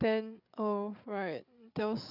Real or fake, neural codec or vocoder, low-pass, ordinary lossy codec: fake; codec, 16 kHz, 8 kbps, FreqCodec, larger model; 5.4 kHz; none